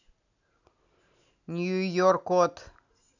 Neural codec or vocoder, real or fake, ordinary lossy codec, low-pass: none; real; none; 7.2 kHz